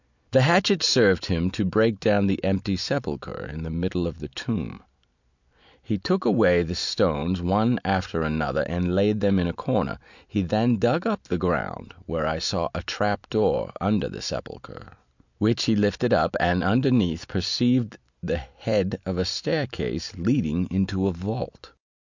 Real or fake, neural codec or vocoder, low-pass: real; none; 7.2 kHz